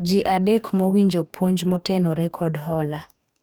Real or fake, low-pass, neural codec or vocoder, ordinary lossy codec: fake; none; codec, 44.1 kHz, 2.6 kbps, DAC; none